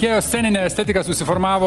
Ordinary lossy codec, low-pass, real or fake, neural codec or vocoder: Opus, 24 kbps; 10.8 kHz; real; none